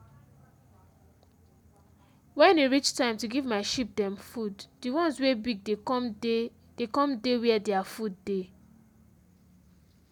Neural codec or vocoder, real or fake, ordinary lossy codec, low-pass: none; real; none; 19.8 kHz